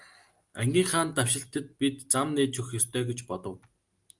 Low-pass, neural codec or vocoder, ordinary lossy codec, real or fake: 10.8 kHz; none; Opus, 32 kbps; real